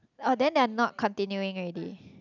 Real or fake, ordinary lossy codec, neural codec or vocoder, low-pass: real; none; none; 7.2 kHz